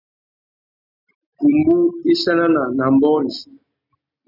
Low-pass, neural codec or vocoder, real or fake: 5.4 kHz; none; real